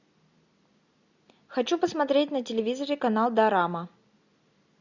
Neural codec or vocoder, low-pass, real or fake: none; 7.2 kHz; real